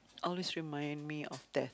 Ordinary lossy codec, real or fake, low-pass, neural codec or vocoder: none; real; none; none